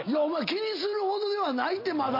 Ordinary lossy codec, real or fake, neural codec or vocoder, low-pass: MP3, 32 kbps; real; none; 5.4 kHz